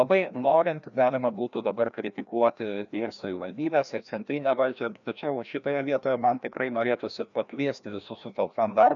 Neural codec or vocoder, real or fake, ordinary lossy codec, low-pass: codec, 16 kHz, 1 kbps, FreqCodec, larger model; fake; MP3, 96 kbps; 7.2 kHz